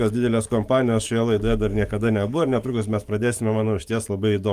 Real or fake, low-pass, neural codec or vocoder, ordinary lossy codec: fake; 14.4 kHz; codec, 44.1 kHz, 7.8 kbps, DAC; Opus, 32 kbps